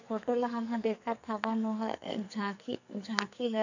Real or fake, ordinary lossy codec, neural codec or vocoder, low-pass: fake; none; codec, 44.1 kHz, 2.6 kbps, SNAC; 7.2 kHz